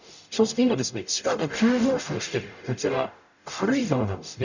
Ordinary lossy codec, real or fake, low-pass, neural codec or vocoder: none; fake; 7.2 kHz; codec, 44.1 kHz, 0.9 kbps, DAC